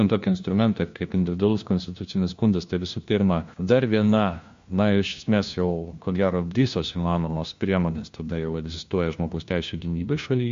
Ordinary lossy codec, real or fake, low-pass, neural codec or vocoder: MP3, 48 kbps; fake; 7.2 kHz; codec, 16 kHz, 1 kbps, FunCodec, trained on LibriTTS, 50 frames a second